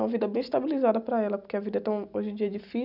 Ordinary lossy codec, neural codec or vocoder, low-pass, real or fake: none; none; 5.4 kHz; real